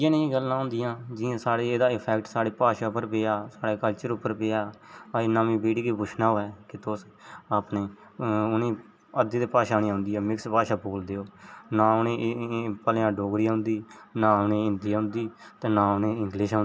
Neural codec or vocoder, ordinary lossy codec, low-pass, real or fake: none; none; none; real